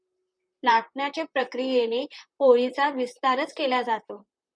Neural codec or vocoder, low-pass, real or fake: vocoder, 44.1 kHz, 128 mel bands, Pupu-Vocoder; 9.9 kHz; fake